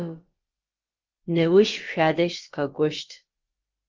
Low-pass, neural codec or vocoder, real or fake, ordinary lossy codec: 7.2 kHz; codec, 16 kHz, about 1 kbps, DyCAST, with the encoder's durations; fake; Opus, 24 kbps